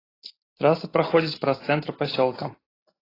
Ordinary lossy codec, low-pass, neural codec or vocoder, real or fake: AAC, 24 kbps; 5.4 kHz; none; real